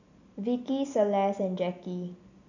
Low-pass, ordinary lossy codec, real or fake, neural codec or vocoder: 7.2 kHz; none; real; none